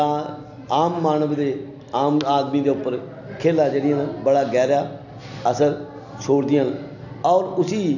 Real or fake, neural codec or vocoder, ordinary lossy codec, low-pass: real; none; none; 7.2 kHz